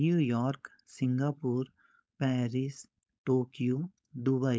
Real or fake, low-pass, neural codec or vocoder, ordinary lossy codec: fake; none; codec, 16 kHz, 4.8 kbps, FACodec; none